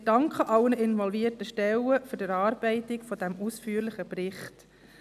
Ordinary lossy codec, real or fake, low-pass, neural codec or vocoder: none; real; 14.4 kHz; none